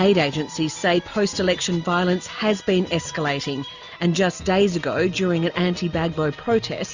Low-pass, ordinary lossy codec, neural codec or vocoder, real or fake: 7.2 kHz; Opus, 64 kbps; none; real